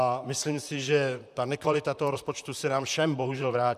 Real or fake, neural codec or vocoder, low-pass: fake; vocoder, 44.1 kHz, 128 mel bands, Pupu-Vocoder; 14.4 kHz